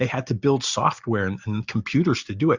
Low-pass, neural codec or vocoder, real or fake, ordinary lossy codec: 7.2 kHz; none; real; Opus, 64 kbps